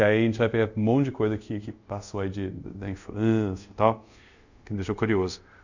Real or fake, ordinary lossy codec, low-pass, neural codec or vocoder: fake; none; 7.2 kHz; codec, 24 kHz, 0.5 kbps, DualCodec